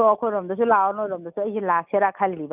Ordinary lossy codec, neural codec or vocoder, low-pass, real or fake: none; none; 3.6 kHz; real